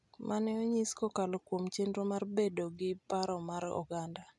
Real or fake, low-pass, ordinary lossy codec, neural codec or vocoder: real; 10.8 kHz; none; none